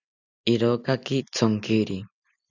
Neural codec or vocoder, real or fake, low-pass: none; real; 7.2 kHz